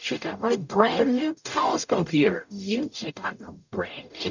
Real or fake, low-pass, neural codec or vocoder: fake; 7.2 kHz; codec, 44.1 kHz, 0.9 kbps, DAC